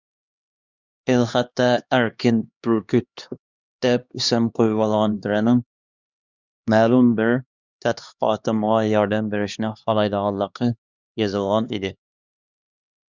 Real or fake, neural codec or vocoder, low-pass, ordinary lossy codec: fake; codec, 16 kHz, 2 kbps, X-Codec, HuBERT features, trained on LibriSpeech; 7.2 kHz; Opus, 64 kbps